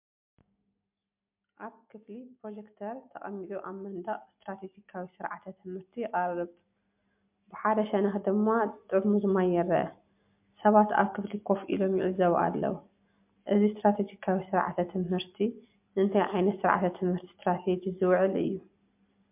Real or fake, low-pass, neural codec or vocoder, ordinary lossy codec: real; 3.6 kHz; none; MP3, 32 kbps